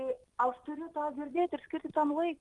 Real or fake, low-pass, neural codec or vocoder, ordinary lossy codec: real; 10.8 kHz; none; Opus, 24 kbps